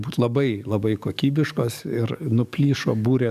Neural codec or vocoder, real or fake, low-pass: codec, 44.1 kHz, 7.8 kbps, DAC; fake; 14.4 kHz